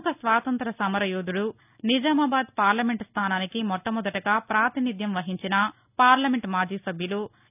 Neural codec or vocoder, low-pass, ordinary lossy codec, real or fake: none; 3.6 kHz; none; real